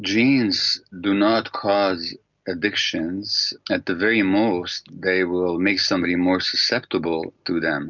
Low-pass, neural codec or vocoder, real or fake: 7.2 kHz; none; real